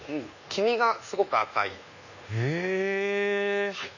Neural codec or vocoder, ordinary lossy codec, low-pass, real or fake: codec, 24 kHz, 1.2 kbps, DualCodec; none; 7.2 kHz; fake